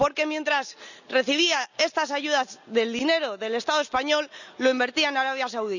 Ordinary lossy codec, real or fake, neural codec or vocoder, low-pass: none; real; none; 7.2 kHz